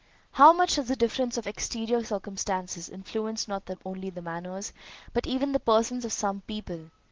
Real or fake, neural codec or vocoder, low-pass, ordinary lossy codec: real; none; 7.2 kHz; Opus, 16 kbps